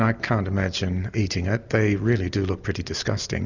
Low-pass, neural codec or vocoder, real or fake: 7.2 kHz; none; real